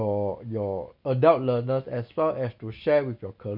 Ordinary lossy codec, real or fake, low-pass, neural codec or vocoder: none; real; 5.4 kHz; none